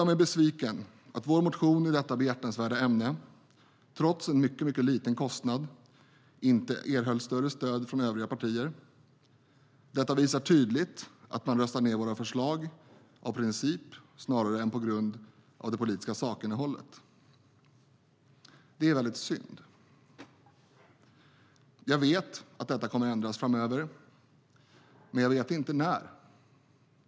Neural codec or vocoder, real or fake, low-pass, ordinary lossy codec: none; real; none; none